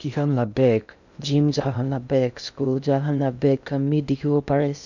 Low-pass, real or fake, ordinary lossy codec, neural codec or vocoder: 7.2 kHz; fake; none; codec, 16 kHz in and 24 kHz out, 0.6 kbps, FocalCodec, streaming, 2048 codes